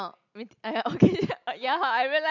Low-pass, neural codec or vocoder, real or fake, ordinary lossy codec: 7.2 kHz; none; real; none